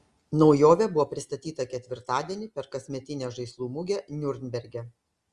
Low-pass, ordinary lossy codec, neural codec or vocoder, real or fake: 10.8 kHz; Opus, 32 kbps; none; real